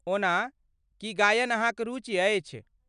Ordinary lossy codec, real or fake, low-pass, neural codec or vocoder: none; real; 9.9 kHz; none